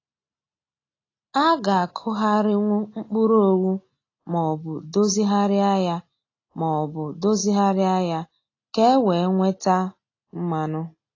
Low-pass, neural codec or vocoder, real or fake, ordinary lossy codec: 7.2 kHz; none; real; AAC, 32 kbps